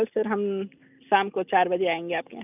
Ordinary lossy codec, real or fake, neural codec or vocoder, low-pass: none; real; none; 3.6 kHz